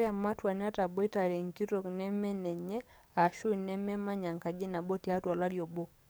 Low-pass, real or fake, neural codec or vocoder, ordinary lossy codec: none; fake; codec, 44.1 kHz, 7.8 kbps, DAC; none